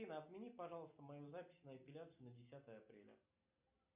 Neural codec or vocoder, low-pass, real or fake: none; 3.6 kHz; real